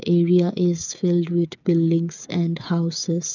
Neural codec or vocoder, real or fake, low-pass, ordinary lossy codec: codec, 16 kHz, 8 kbps, FreqCodec, smaller model; fake; 7.2 kHz; none